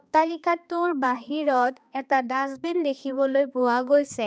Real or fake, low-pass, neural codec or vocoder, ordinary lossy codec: fake; none; codec, 16 kHz, 2 kbps, X-Codec, HuBERT features, trained on balanced general audio; none